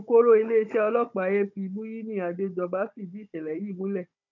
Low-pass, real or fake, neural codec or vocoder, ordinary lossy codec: 7.2 kHz; fake; codec, 16 kHz, 16 kbps, FunCodec, trained on Chinese and English, 50 frames a second; none